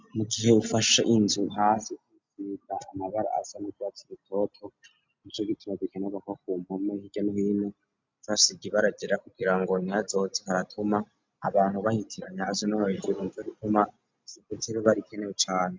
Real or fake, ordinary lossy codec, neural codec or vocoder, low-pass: real; MP3, 64 kbps; none; 7.2 kHz